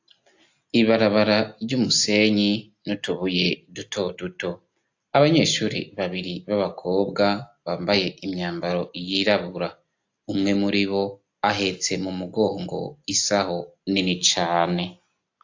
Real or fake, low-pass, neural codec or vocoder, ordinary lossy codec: real; 7.2 kHz; none; AAC, 48 kbps